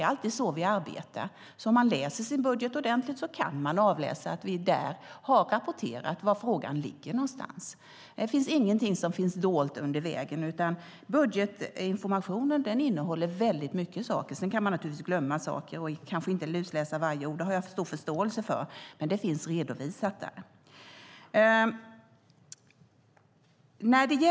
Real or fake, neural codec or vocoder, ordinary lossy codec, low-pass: real; none; none; none